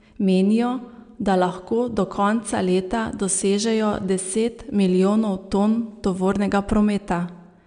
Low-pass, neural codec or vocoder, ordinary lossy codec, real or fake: 9.9 kHz; none; none; real